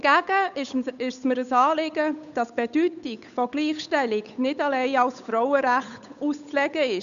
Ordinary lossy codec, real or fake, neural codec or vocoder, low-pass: none; fake; codec, 16 kHz, 8 kbps, FunCodec, trained on Chinese and English, 25 frames a second; 7.2 kHz